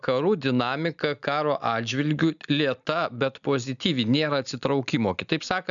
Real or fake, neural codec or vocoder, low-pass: real; none; 7.2 kHz